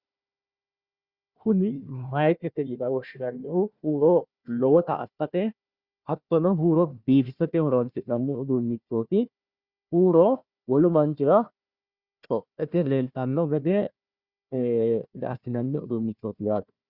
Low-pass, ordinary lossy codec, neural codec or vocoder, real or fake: 5.4 kHz; Opus, 64 kbps; codec, 16 kHz, 1 kbps, FunCodec, trained on Chinese and English, 50 frames a second; fake